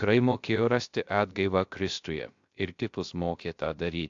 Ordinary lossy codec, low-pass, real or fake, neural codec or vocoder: AAC, 64 kbps; 7.2 kHz; fake; codec, 16 kHz, 0.3 kbps, FocalCodec